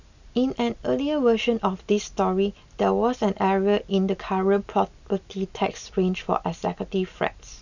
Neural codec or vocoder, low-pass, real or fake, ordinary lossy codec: none; 7.2 kHz; real; none